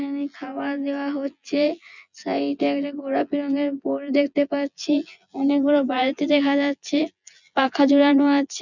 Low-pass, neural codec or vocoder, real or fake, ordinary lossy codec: 7.2 kHz; vocoder, 24 kHz, 100 mel bands, Vocos; fake; none